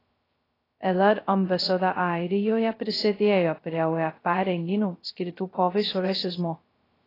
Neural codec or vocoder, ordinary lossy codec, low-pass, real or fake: codec, 16 kHz, 0.2 kbps, FocalCodec; AAC, 24 kbps; 5.4 kHz; fake